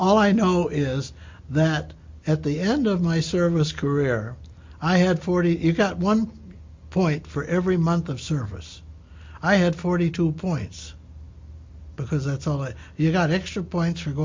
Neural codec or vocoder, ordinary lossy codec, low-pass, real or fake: none; MP3, 48 kbps; 7.2 kHz; real